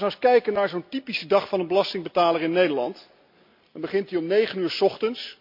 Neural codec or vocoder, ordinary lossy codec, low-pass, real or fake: none; MP3, 48 kbps; 5.4 kHz; real